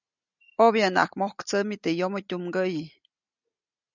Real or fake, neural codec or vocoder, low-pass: real; none; 7.2 kHz